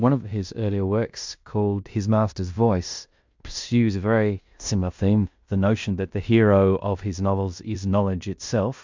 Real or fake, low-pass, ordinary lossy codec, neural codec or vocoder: fake; 7.2 kHz; MP3, 64 kbps; codec, 16 kHz in and 24 kHz out, 0.9 kbps, LongCat-Audio-Codec, fine tuned four codebook decoder